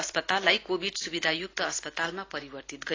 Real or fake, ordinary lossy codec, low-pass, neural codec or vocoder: real; AAC, 32 kbps; 7.2 kHz; none